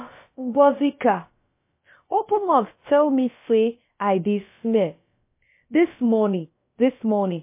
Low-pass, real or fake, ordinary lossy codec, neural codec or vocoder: 3.6 kHz; fake; MP3, 24 kbps; codec, 16 kHz, about 1 kbps, DyCAST, with the encoder's durations